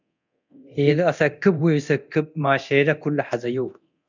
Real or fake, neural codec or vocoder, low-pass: fake; codec, 24 kHz, 0.9 kbps, DualCodec; 7.2 kHz